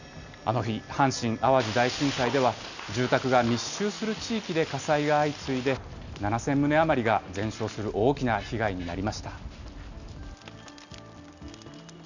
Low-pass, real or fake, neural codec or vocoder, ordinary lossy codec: 7.2 kHz; real; none; none